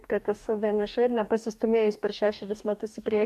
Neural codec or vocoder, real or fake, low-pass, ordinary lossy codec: codec, 44.1 kHz, 2.6 kbps, DAC; fake; 14.4 kHz; AAC, 96 kbps